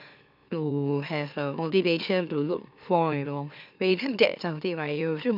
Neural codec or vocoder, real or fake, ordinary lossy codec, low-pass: autoencoder, 44.1 kHz, a latent of 192 numbers a frame, MeloTTS; fake; none; 5.4 kHz